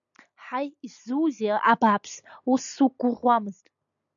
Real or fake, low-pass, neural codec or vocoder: real; 7.2 kHz; none